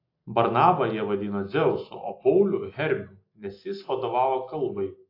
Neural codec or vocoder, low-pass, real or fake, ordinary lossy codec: none; 5.4 kHz; real; AAC, 32 kbps